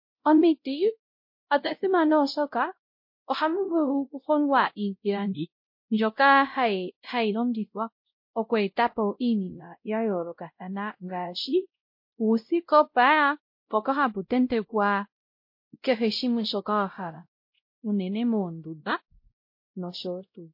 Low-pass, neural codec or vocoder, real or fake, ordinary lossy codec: 5.4 kHz; codec, 16 kHz, 0.5 kbps, X-Codec, WavLM features, trained on Multilingual LibriSpeech; fake; MP3, 32 kbps